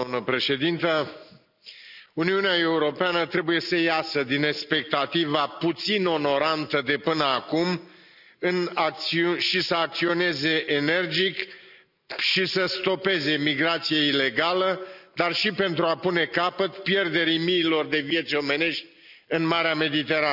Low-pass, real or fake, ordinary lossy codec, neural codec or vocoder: 5.4 kHz; real; none; none